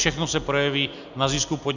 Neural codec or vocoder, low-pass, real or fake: none; 7.2 kHz; real